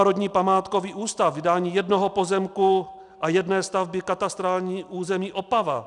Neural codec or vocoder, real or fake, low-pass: none; real; 10.8 kHz